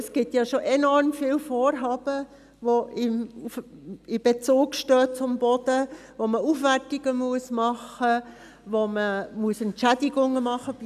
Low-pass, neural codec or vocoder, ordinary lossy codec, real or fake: 14.4 kHz; none; none; real